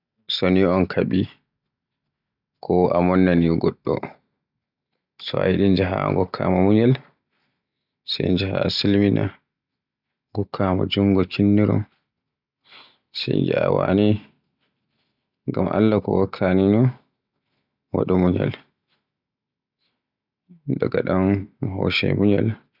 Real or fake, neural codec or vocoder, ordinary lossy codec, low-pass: real; none; none; 5.4 kHz